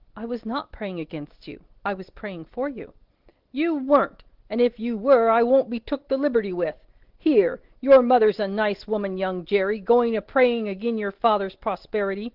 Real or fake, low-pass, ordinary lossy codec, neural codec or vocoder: real; 5.4 kHz; Opus, 16 kbps; none